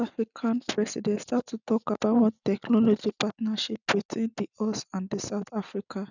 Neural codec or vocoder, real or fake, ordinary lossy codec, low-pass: none; real; none; 7.2 kHz